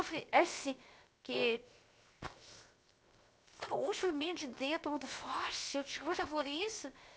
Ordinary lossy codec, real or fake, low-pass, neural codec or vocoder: none; fake; none; codec, 16 kHz, 0.7 kbps, FocalCodec